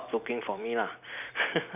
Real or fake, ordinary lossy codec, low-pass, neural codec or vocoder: fake; none; 3.6 kHz; vocoder, 44.1 kHz, 128 mel bands every 256 samples, BigVGAN v2